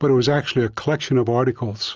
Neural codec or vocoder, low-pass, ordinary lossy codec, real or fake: none; 7.2 kHz; Opus, 24 kbps; real